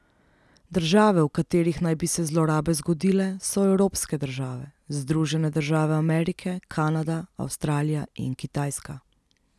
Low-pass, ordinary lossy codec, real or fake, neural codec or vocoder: none; none; real; none